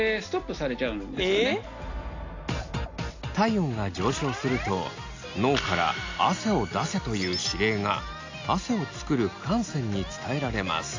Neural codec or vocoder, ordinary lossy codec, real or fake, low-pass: none; AAC, 48 kbps; real; 7.2 kHz